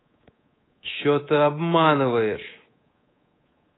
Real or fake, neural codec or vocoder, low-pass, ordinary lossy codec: fake; codec, 24 kHz, 3.1 kbps, DualCodec; 7.2 kHz; AAC, 16 kbps